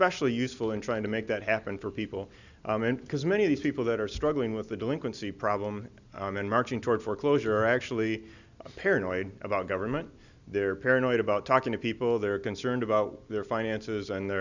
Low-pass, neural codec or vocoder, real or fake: 7.2 kHz; none; real